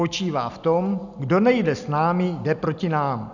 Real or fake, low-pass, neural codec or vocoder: real; 7.2 kHz; none